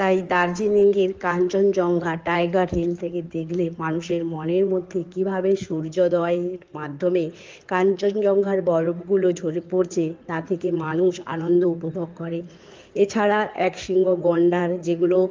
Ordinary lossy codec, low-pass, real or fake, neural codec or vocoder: Opus, 24 kbps; 7.2 kHz; fake; codec, 16 kHz in and 24 kHz out, 2.2 kbps, FireRedTTS-2 codec